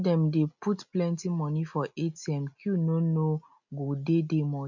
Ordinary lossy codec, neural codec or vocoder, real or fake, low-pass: none; none; real; 7.2 kHz